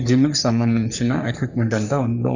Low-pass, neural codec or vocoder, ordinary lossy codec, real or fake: 7.2 kHz; codec, 44.1 kHz, 3.4 kbps, Pupu-Codec; none; fake